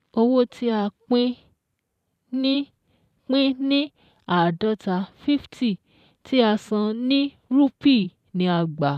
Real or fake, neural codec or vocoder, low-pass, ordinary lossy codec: fake; vocoder, 44.1 kHz, 128 mel bands every 512 samples, BigVGAN v2; 14.4 kHz; none